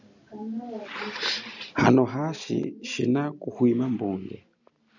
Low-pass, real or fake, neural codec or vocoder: 7.2 kHz; real; none